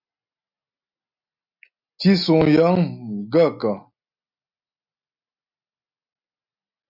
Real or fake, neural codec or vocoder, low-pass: real; none; 5.4 kHz